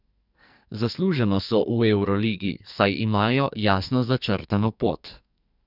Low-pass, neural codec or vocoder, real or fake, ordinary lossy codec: 5.4 kHz; codec, 44.1 kHz, 2.6 kbps, SNAC; fake; none